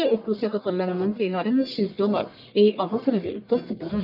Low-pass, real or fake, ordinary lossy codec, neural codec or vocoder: 5.4 kHz; fake; none; codec, 44.1 kHz, 1.7 kbps, Pupu-Codec